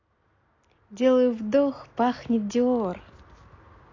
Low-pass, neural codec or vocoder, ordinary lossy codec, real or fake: 7.2 kHz; none; AAC, 32 kbps; real